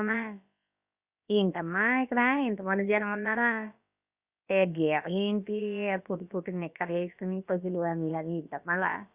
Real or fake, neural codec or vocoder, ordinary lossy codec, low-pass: fake; codec, 16 kHz, about 1 kbps, DyCAST, with the encoder's durations; Opus, 64 kbps; 3.6 kHz